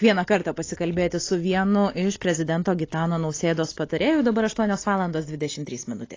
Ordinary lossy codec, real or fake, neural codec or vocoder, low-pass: AAC, 32 kbps; real; none; 7.2 kHz